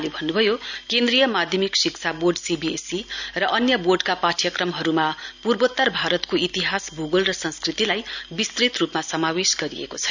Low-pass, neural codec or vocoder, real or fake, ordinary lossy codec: 7.2 kHz; none; real; none